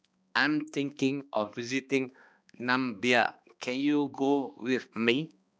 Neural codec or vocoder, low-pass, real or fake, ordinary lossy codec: codec, 16 kHz, 2 kbps, X-Codec, HuBERT features, trained on balanced general audio; none; fake; none